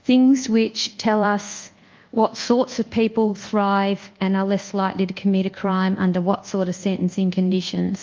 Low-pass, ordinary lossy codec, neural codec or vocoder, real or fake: 7.2 kHz; Opus, 32 kbps; codec, 24 kHz, 1.2 kbps, DualCodec; fake